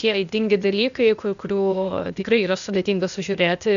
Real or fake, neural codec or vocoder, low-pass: fake; codec, 16 kHz, 0.8 kbps, ZipCodec; 7.2 kHz